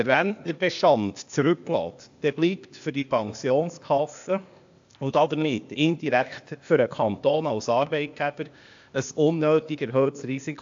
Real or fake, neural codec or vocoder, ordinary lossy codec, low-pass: fake; codec, 16 kHz, 0.8 kbps, ZipCodec; AAC, 64 kbps; 7.2 kHz